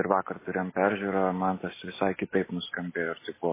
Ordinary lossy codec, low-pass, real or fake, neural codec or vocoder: MP3, 16 kbps; 3.6 kHz; real; none